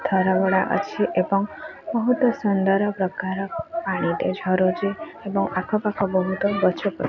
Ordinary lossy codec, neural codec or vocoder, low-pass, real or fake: none; none; 7.2 kHz; real